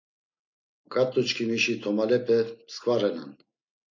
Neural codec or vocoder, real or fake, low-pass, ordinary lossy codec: none; real; 7.2 kHz; MP3, 48 kbps